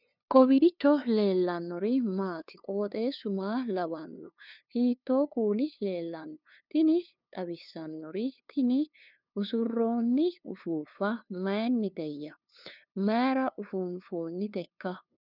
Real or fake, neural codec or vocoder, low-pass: fake; codec, 16 kHz, 2 kbps, FunCodec, trained on LibriTTS, 25 frames a second; 5.4 kHz